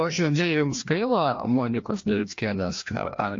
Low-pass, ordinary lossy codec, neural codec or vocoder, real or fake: 7.2 kHz; AAC, 48 kbps; codec, 16 kHz, 1 kbps, FreqCodec, larger model; fake